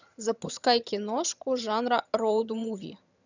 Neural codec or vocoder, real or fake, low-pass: vocoder, 22.05 kHz, 80 mel bands, HiFi-GAN; fake; 7.2 kHz